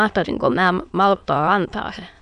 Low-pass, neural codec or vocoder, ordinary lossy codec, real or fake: 9.9 kHz; autoencoder, 22.05 kHz, a latent of 192 numbers a frame, VITS, trained on many speakers; AAC, 96 kbps; fake